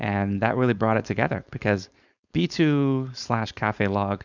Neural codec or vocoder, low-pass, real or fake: codec, 16 kHz, 4.8 kbps, FACodec; 7.2 kHz; fake